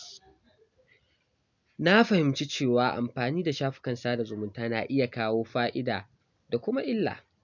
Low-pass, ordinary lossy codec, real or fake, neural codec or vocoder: 7.2 kHz; none; real; none